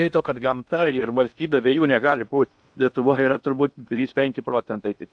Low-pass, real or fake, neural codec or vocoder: 9.9 kHz; fake; codec, 16 kHz in and 24 kHz out, 0.8 kbps, FocalCodec, streaming, 65536 codes